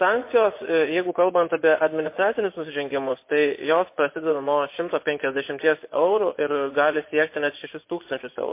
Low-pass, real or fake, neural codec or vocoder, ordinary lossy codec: 3.6 kHz; fake; vocoder, 24 kHz, 100 mel bands, Vocos; MP3, 24 kbps